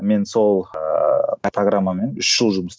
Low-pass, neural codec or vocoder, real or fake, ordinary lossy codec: none; none; real; none